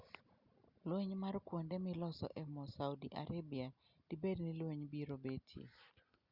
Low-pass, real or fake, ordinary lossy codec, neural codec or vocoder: 5.4 kHz; real; Opus, 64 kbps; none